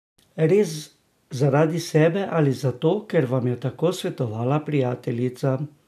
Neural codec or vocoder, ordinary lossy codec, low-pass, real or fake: none; none; 14.4 kHz; real